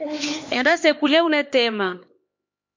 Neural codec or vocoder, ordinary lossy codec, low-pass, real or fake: codec, 16 kHz, 2 kbps, X-Codec, HuBERT features, trained on LibriSpeech; MP3, 48 kbps; 7.2 kHz; fake